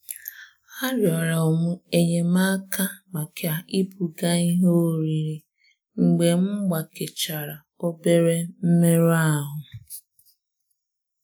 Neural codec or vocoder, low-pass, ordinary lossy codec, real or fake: none; none; none; real